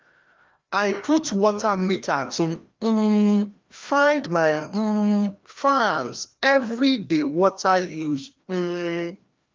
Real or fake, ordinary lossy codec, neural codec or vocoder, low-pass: fake; Opus, 32 kbps; codec, 16 kHz, 1 kbps, FreqCodec, larger model; 7.2 kHz